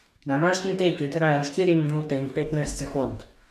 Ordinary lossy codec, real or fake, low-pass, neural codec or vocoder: none; fake; 14.4 kHz; codec, 44.1 kHz, 2.6 kbps, DAC